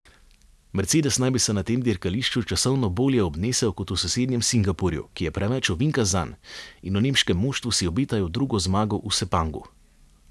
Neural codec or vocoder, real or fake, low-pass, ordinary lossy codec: none; real; none; none